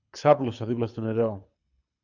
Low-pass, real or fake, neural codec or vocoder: 7.2 kHz; fake; codec, 24 kHz, 6 kbps, HILCodec